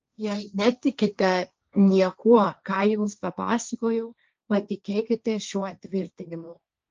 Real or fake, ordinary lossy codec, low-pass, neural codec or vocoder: fake; Opus, 24 kbps; 7.2 kHz; codec, 16 kHz, 1.1 kbps, Voila-Tokenizer